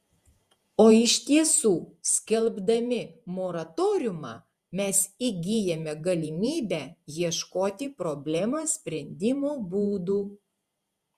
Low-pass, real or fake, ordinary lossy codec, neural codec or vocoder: 14.4 kHz; fake; Opus, 64 kbps; vocoder, 48 kHz, 128 mel bands, Vocos